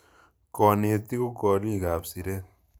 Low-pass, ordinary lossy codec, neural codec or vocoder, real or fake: none; none; vocoder, 44.1 kHz, 128 mel bands every 512 samples, BigVGAN v2; fake